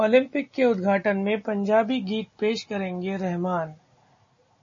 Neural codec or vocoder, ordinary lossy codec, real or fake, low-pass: none; MP3, 32 kbps; real; 7.2 kHz